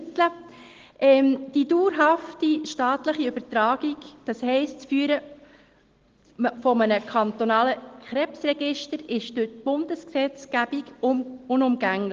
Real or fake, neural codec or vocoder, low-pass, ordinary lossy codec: real; none; 7.2 kHz; Opus, 16 kbps